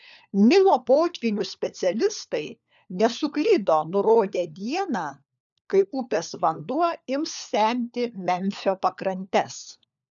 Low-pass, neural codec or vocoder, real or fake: 7.2 kHz; codec, 16 kHz, 4 kbps, FunCodec, trained on LibriTTS, 50 frames a second; fake